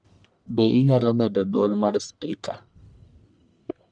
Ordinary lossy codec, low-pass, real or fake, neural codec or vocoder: none; 9.9 kHz; fake; codec, 44.1 kHz, 1.7 kbps, Pupu-Codec